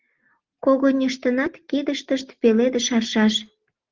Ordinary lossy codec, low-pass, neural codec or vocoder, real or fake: Opus, 16 kbps; 7.2 kHz; none; real